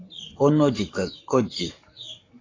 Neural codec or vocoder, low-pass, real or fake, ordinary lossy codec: codec, 44.1 kHz, 7.8 kbps, Pupu-Codec; 7.2 kHz; fake; AAC, 32 kbps